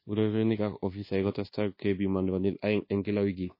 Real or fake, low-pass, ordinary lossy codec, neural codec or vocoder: fake; 5.4 kHz; MP3, 24 kbps; codec, 24 kHz, 1.2 kbps, DualCodec